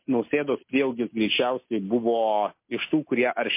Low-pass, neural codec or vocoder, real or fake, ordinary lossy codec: 3.6 kHz; none; real; MP3, 24 kbps